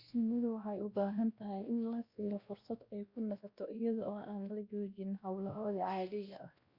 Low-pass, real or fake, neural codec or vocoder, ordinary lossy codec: 5.4 kHz; fake; codec, 16 kHz, 1 kbps, X-Codec, WavLM features, trained on Multilingual LibriSpeech; Opus, 64 kbps